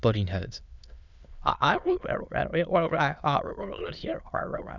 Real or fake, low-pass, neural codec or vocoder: fake; 7.2 kHz; autoencoder, 22.05 kHz, a latent of 192 numbers a frame, VITS, trained on many speakers